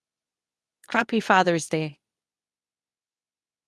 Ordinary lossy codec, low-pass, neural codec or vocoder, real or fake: none; none; codec, 24 kHz, 0.9 kbps, WavTokenizer, medium speech release version 2; fake